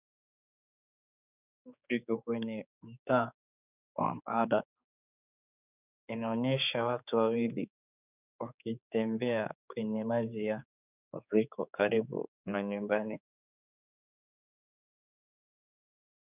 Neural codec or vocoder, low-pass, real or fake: codec, 16 kHz, 4 kbps, X-Codec, HuBERT features, trained on general audio; 3.6 kHz; fake